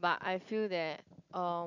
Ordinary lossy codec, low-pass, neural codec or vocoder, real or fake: none; 7.2 kHz; none; real